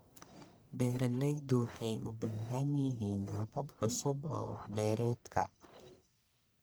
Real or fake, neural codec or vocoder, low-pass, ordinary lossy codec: fake; codec, 44.1 kHz, 1.7 kbps, Pupu-Codec; none; none